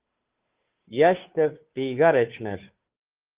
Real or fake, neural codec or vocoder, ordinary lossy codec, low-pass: fake; codec, 16 kHz, 2 kbps, FunCodec, trained on Chinese and English, 25 frames a second; Opus, 32 kbps; 3.6 kHz